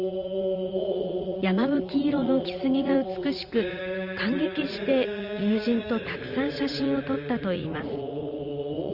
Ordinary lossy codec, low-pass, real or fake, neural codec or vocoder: Opus, 32 kbps; 5.4 kHz; fake; vocoder, 44.1 kHz, 80 mel bands, Vocos